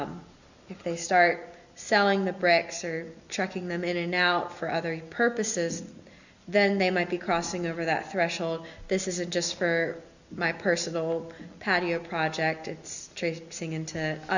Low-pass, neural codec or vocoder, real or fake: 7.2 kHz; none; real